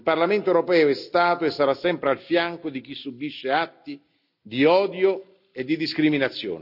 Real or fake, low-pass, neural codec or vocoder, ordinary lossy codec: real; 5.4 kHz; none; none